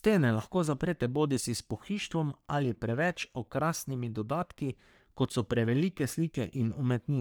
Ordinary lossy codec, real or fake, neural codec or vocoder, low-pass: none; fake; codec, 44.1 kHz, 3.4 kbps, Pupu-Codec; none